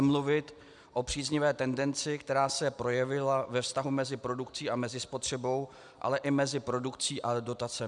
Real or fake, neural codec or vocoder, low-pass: real; none; 10.8 kHz